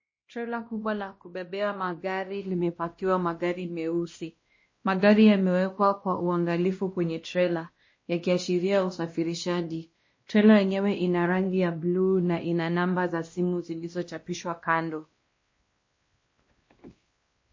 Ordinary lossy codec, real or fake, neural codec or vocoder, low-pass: MP3, 32 kbps; fake; codec, 16 kHz, 1 kbps, X-Codec, WavLM features, trained on Multilingual LibriSpeech; 7.2 kHz